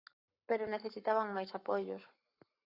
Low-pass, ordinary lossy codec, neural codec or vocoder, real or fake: 5.4 kHz; Opus, 64 kbps; codec, 44.1 kHz, 7.8 kbps, Pupu-Codec; fake